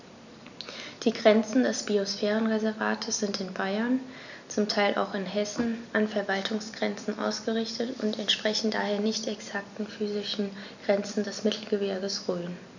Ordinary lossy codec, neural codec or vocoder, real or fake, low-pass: none; none; real; 7.2 kHz